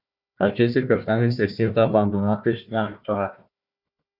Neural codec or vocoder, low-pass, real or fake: codec, 16 kHz, 1 kbps, FunCodec, trained on Chinese and English, 50 frames a second; 5.4 kHz; fake